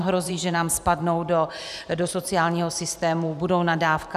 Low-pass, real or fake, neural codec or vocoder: 14.4 kHz; fake; vocoder, 44.1 kHz, 128 mel bands every 512 samples, BigVGAN v2